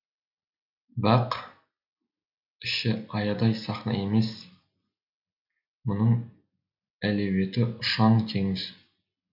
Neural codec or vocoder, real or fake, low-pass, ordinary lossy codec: none; real; 5.4 kHz; none